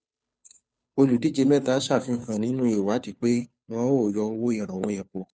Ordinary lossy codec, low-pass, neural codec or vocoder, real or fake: none; none; codec, 16 kHz, 2 kbps, FunCodec, trained on Chinese and English, 25 frames a second; fake